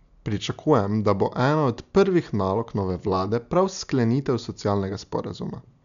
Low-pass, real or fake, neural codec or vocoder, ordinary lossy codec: 7.2 kHz; real; none; MP3, 96 kbps